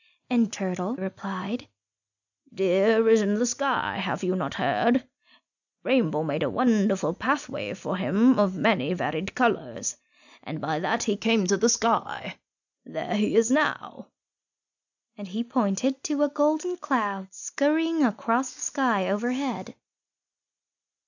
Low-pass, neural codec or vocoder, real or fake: 7.2 kHz; none; real